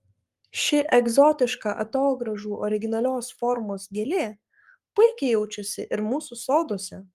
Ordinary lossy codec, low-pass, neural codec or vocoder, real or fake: Opus, 32 kbps; 14.4 kHz; codec, 44.1 kHz, 7.8 kbps, Pupu-Codec; fake